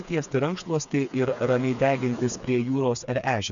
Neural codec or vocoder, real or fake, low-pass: codec, 16 kHz, 4 kbps, FreqCodec, smaller model; fake; 7.2 kHz